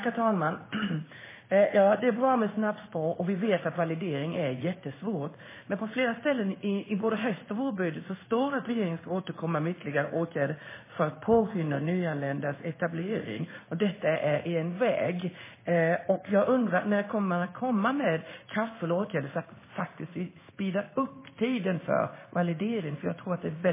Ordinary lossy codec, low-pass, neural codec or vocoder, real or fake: MP3, 16 kbps; 3.6 kHz; codec, 16 kHz in and 24 kHz out, 1 kbps, XY-Tokenizer; fake